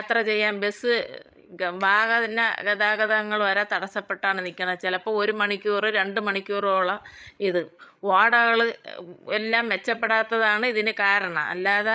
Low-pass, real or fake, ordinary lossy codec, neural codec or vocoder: none; fake; none; codec, 16 kHz, 16 kbps, FreqCodec, larger model